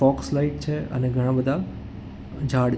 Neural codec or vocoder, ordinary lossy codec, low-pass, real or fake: none; none; none; real